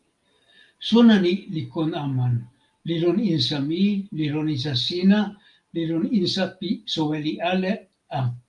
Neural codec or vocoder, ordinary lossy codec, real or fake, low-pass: none; Opus, 32 kbps; real; 10.8 kHz